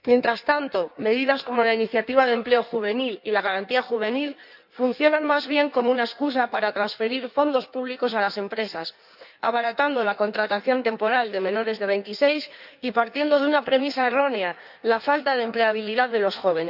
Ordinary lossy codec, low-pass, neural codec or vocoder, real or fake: none; 5.4 kHz; codec, 16 kHz in and 24 kHz out, 1.1 kbps, FireRedTTS-2 codec; fake